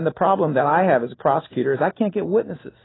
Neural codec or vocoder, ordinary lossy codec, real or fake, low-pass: none; AAC, 16 kbps; real; 7.2 kHz